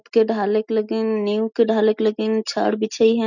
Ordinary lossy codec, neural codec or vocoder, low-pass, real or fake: none; none; 7.2 kHz; real